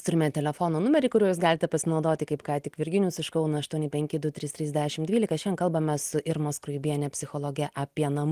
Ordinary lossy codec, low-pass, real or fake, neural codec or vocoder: Opus, 24 kbps; 14.4 kHz; real; none